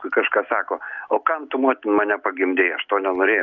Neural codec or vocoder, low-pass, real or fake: none; 7.2 kHz; real